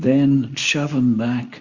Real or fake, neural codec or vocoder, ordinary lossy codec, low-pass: fake; codec, 24 kHz, 0.9 kbps, WavTokenizer, medium speech release version 2; Opus, 64 kbps; 7.2 kHz